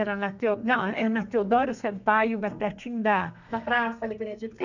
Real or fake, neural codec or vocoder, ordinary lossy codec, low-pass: fake; codec, 32 kHz, 1.9 kbps, SNAC; none; 7.2 kHz